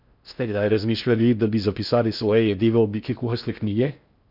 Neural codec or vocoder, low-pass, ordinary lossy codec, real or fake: codec, 16 kHz in and 24 kHz out, 0.6 kbps, FocalCodec, streaming, 4096 codes; 5.4 kHz; none; fake